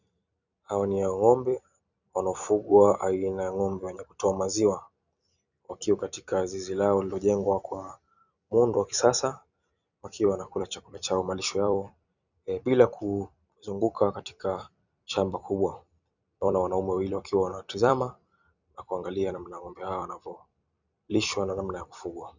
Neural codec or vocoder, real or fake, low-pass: vocoder, 44.1 kHz, 128 mel bands every 256 samples, BigVGAN v2; fake; 7.2 kHz